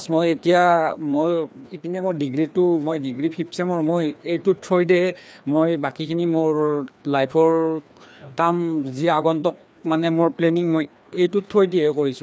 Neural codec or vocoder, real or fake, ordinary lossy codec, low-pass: codec, 16 kHz, 2 kbps, FreqCodec, larger model; fake; none; none